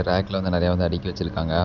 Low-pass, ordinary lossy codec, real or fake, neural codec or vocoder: 7.2 kHz; none; real; none